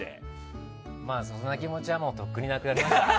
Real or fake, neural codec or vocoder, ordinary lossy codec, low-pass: real; none; none; none